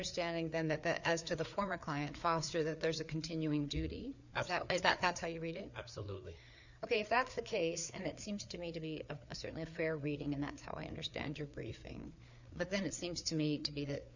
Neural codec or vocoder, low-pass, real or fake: codec, 16 kHz, 4 kbps, FreqCodec, larger model; 7.2 kHz; fake